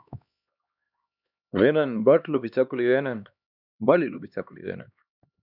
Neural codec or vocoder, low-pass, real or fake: codec, 16 kHz, 2 kbps, X-Codec, HuBERT features, trained on LibriSpeech; 5.4 kHz; fake